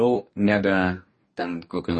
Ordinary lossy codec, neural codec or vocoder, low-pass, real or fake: MP3, 32 kbps; codec, 44.1 kHz, 2.6 kbps, DAC; 10.8 kHz; fake